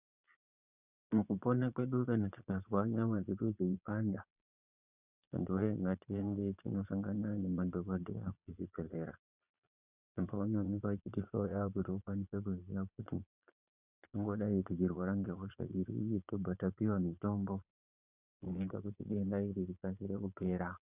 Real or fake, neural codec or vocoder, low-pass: fake; vocoder, 22.05 kHz, 80 mel bands, Vocos; 3.6 kHz